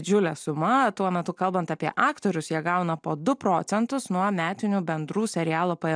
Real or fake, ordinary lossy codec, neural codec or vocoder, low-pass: real; MP3, 96 kbps; none; 9.9 kHz